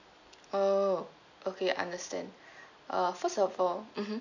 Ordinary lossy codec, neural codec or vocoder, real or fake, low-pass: none; none; real; 7.2 kHz